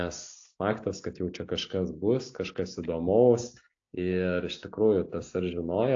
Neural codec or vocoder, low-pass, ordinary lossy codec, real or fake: none; 7.2 kHz; AAC, 48 kbps; real